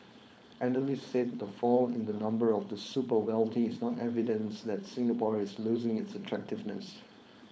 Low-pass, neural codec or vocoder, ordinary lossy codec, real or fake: none; codec, 16 kHz, 4.8 kbps, FACodec; none; fake